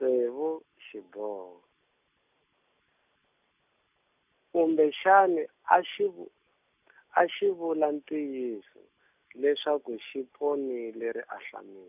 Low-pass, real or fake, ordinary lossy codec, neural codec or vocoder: 3.6 kHz; real; none; none